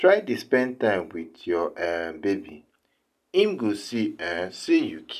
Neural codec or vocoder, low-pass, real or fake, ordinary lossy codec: none; 14.4 kHz; real; none